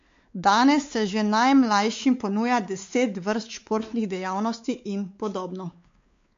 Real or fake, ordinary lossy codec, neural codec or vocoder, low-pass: fake; MP3, 48 kbps; codec, 16 kHz, 4 kbps, X-Codec, WavLM features, trained on Multilingual LibriSpeech; 7.2 kHz